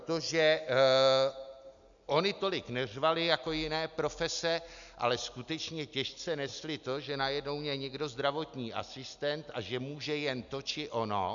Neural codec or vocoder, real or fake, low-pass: none; real; 7.2 kHz